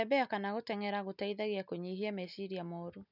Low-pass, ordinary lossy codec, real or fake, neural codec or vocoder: 5.4 kHz; none; real; none